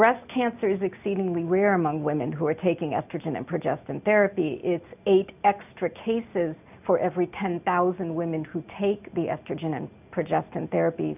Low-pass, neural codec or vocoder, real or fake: 3.6 kHz; none; real